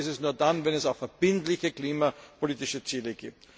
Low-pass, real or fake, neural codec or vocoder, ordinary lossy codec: none; real; none; none